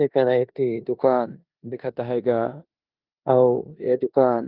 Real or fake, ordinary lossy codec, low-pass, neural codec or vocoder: fake; Opus, 24 kbps; 5.4 kHz; codec, 16 kHz in and 24 kHz out, 0.9 kbps, LongCat-Audio-Codec, four codebook decoder